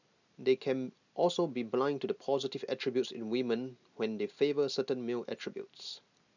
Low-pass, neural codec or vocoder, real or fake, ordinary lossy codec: 7.2 kHz; none; real; none